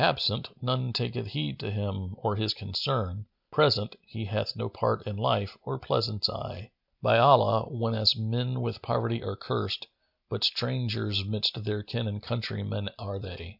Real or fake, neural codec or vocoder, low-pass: real; none; 5.4 kHz